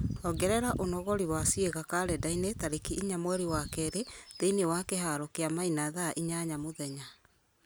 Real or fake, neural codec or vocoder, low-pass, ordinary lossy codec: real; none; none; none